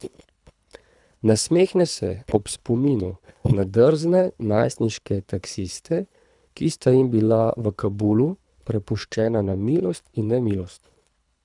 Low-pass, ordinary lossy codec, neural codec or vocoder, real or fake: 10.8 kHz; none; codec, 24 kHz, 3 kbps, HILCodec; fake